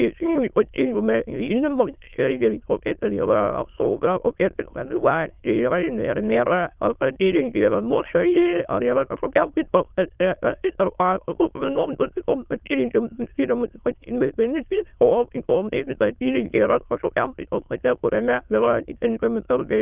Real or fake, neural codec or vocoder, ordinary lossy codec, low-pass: fake; autoencoder, 22.05 kHz, a latent of 192 numbers a frame, VITS, trained on many speakers; Opus, 24 kbps; 3.6 kHz